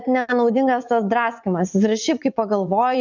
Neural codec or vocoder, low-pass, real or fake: vocoder, 44.1 kHz, 80 mel bands, Vocos; 7.2 kHz; fake